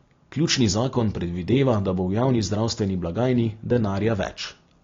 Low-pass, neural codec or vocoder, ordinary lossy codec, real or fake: 7.2 kHz; none; AAC, 32 kbps; real